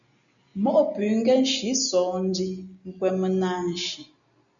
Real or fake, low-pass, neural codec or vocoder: real; 7.2 kHz; none